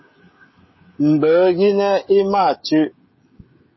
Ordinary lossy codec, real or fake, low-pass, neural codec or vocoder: MP3, 24 kbps; fake; 7.2 kHz; codec, 16 kHz, 16 kbps, FreqCodec, smaller model